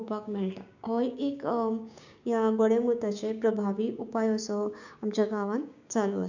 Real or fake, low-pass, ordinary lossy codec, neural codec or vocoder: fake; 7.2 kHz; none; codec, 16 kHz, 6 kbps, DAC